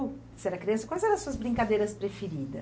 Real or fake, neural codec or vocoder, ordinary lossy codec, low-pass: real; none; none; none